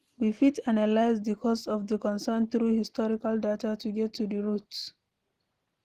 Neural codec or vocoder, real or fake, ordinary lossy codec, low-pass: none; real; Opus, 16 kbps; 14.4 kHz